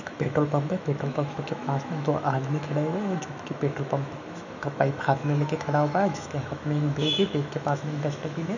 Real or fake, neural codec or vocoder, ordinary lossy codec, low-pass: fake; autoencoder, 48 kHz, 128 numbers a frame, DAC-VAE, trained on Japanese speech; none; 7.2 kHz